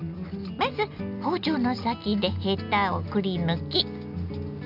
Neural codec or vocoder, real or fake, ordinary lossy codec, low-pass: none; real; none; 5.4 kHz